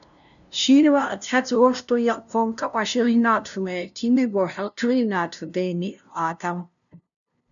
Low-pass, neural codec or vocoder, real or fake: 7.2 kHz; codec, 16 kHz, 0.5 kbps, FunCodec, trained on LibriTTS, 25 frames a second; fake